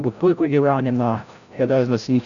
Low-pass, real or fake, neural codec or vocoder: 7.2 kHz; fake; codec, 16 kHz, 0.5 kbps, FreqCodec, larger model